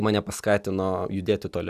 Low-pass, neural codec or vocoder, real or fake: 14.4 kHz; none; real